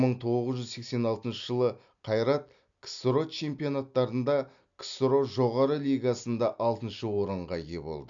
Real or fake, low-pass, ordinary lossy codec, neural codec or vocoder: real; 7.2 kHz; MP3, 96 kbps; none